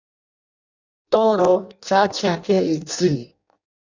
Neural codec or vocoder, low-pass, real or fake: codec, 16 kHz in and 24 kHz out, 0.6 kbps, FireRedTTS-2 codec; 7.2 kHz; fake